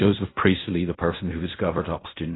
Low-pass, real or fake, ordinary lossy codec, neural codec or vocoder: 7.2 kHz; fake; AAC, 16 kbps; codec, 16 kHz in and 24 kHz out, 0.4 kbps, LongCat-Audio-Codec, fine tuned four codebook decoder